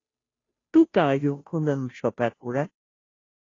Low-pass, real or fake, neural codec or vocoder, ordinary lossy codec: 7.2 kHz; fake; codec, 16 kHz, 0.5 kbps, FunCodec, trained on Chinese and English, 25 frames a second; AAC, 32 kbps